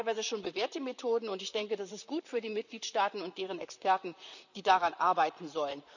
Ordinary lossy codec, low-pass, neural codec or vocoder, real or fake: none; 7.2 kHz; vocoder, 44.1 kHz, 128 mel bands, Pupu-Vocoder; fake